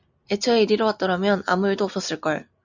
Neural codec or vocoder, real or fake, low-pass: none; real; 7.2 kHz